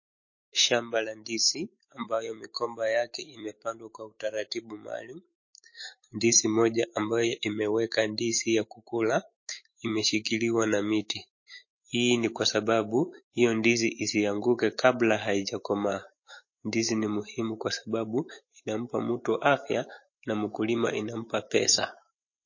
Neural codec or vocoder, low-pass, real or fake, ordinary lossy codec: autoencoder, 48 kHz, 128 numbers a frame, DAC-VAE, trained on Japanese speech; 7.2 kHz; fake; MP3, 32 kbps